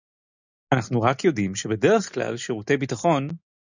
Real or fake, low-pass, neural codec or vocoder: real; 7.2 kHz; none